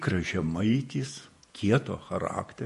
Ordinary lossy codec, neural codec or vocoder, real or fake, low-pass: MP3, 48 kbps; vocoder, 48 kHz, 128 mel bands, Vocos; fake; 14.4 kHz